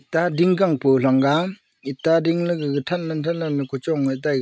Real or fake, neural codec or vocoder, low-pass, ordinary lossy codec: real; none; none; none